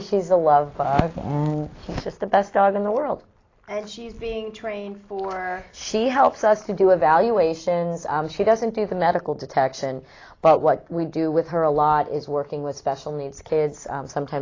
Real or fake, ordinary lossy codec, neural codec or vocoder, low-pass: real; AAC, 32 kbps; none; 7.2 kHz